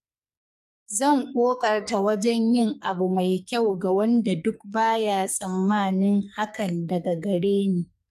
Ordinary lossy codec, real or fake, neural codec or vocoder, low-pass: MP3, 96 kbps; fake; codec, 44.1 kHz, 2.6 kbps, SNAC; 14.4 kHz